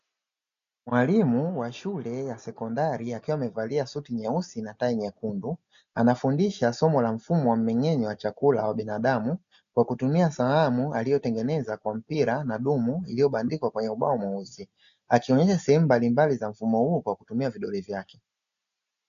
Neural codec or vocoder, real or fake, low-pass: none; real; 7.2 kHz